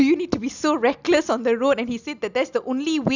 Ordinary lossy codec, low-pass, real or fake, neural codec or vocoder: none; 7.2 kHz; real; none